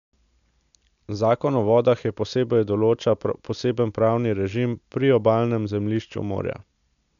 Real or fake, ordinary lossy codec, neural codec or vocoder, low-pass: real; none; none; 7.2 kHz